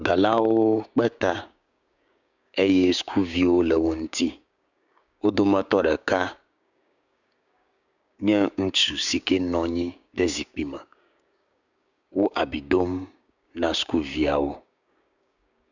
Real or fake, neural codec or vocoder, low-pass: fake; codec, 16 kHz, 6 kbps, DAC; 7.2 kHz